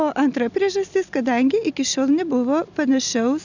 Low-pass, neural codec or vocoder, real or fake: 7.2 kHz; none; real